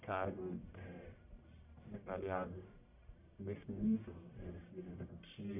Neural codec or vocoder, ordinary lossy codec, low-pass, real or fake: codec, 44.1 kHz, 1.7 kbps, Pupu-Codec; none; 3.6 kHz; fake